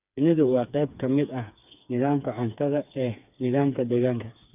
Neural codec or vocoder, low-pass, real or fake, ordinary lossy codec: codec, 16 kHz, 4 kbps, FreqCodec, smaller model; 3.6 kHz; fake; none